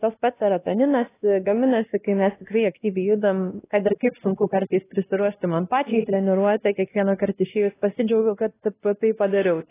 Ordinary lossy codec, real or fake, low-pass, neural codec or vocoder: AAC, 16 kbps; fake; 3.6 kHz; codec, 16 kHz, 1 kbps, X-Codec, WavLM features, trained on Multilingual LibriSpeech